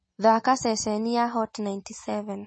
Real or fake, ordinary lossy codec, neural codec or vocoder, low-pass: real; MP3, 32 kbps; none; 9.9 kHz